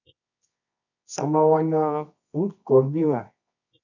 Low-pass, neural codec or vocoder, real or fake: 7.2 kHz; codec, 24 kHz, 0.9 kbps, WavTokenizer, medium music audio release; fake